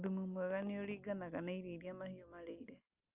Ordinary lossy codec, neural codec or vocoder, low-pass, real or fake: Opus, 32 kbps; none; 3.6 kHz; real